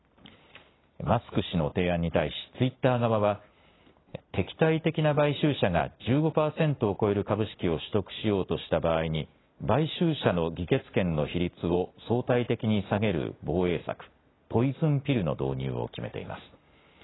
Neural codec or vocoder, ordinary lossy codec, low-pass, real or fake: none; AAC, 16 kbps; 7.2 kHz; real